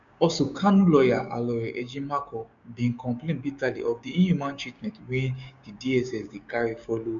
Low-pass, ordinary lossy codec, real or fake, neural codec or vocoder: 7.2 kHz; none; fake; codec, 16 kHz, 6 kbps, DAC